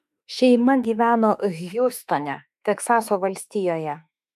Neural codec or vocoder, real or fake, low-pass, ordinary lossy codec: autoencoder, 48 kHz, 32 numbers a frame, DAC-VAE, trained on Japanese speech; fake; 14.4 kHz; MP3, 96 kbps